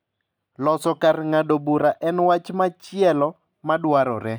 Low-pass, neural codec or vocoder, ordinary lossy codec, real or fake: none; none; none; real